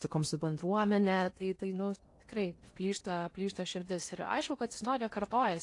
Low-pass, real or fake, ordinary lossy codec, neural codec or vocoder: 10.8 kHz; fake; AAC, 48 kbps; codec, 16 kHz in and 24 kHz out, 0.8 kbps, FocalCodec, streaming, 65536 codes